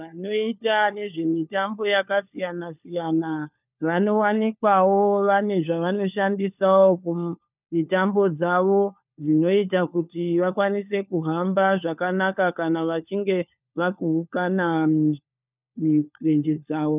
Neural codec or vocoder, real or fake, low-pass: codec, 16 kHz, 4 kbps, FunCodec, trained on LibriTTS, 50 frames a second; fake; 3.6 kHz